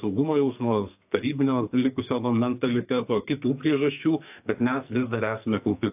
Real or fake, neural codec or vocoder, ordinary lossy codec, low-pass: fake; codec, 44.1 kHz, 2.6 kbps, SNAC; AAC, 32 kbps; 3.6 kHz